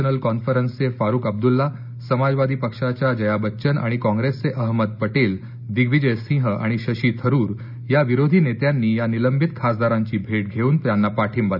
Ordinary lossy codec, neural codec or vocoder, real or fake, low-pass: none; none; real; 5.4 kHz